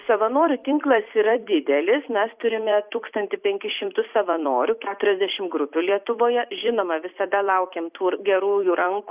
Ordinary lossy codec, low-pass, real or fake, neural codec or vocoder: Opus, 64 kbps; 3.6 kHz; fake; vocoder, 44.1 kHz, 128 mel bands every 256 samples, BigVGAN v2